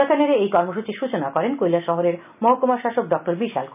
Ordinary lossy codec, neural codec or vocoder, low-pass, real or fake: none; none; 3.6 kHz; real